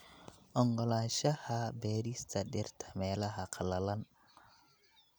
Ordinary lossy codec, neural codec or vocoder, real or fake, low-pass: none; none; real; none